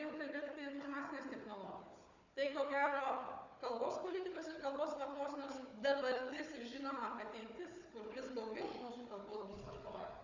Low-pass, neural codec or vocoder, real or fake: 7.2 kHz; codec, 16 kHz, 4 kbps, FunCodec, trained on Chinese and English, 50 frames a second; fake